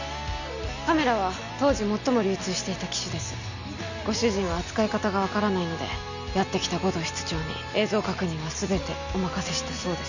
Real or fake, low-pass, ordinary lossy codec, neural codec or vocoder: real; 7.2 kHz; none; none